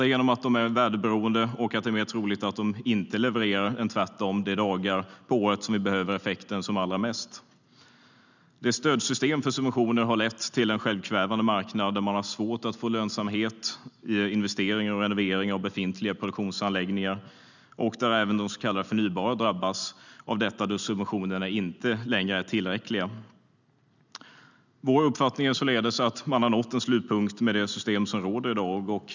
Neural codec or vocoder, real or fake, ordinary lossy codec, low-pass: none; real; none; 7.2 kHz